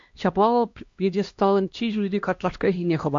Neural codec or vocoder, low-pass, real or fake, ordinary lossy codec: codec, 16 kHz, 1 kbps, X-Codec, HuBERT features, trained on LibriSpeech; 7.2 kHz; fake; MP3, 48 kbps